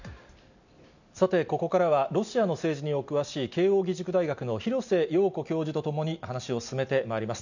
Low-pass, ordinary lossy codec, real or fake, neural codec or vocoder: 7.2 kHz; none; real; none